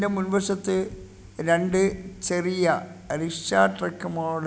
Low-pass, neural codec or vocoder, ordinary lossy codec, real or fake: none; none; none; real